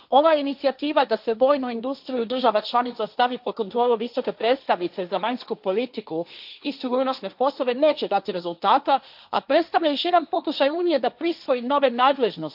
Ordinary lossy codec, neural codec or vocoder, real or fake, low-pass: none; codec, 16 kHz, 1.1 kbps, Voila-Tokenizer; fake; 5.4 kHz